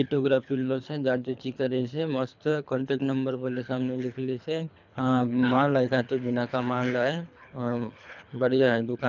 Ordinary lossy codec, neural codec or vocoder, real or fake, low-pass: none; codec, 24 kHz, 3 kbps, HILCodec; fake; 7.2 kHz